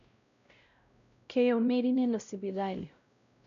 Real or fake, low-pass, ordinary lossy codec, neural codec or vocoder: fake; 7.2 kHz; AAC, 96 kbps; codec, 16 kHz, 0.5 kbps, X-Codec, WavLM features, trained on Multilingual LibriSpeech